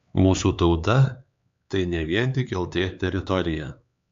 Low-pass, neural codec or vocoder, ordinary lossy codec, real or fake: 7.2 kHz; codec, 16 kHz, 4 kbps, X-Codec, HuBERT features, trained on LibriSpeech; AAC, 64 kbps; fake